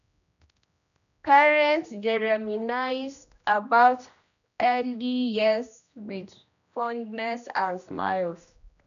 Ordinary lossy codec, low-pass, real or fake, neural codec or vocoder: none; 7.2 kHz; fake; codec, 16 kHz, 1 kbps, X-Codec, HuBERT features, trained on general audio